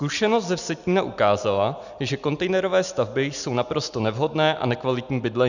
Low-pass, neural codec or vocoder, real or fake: 7.2 kHz; none; real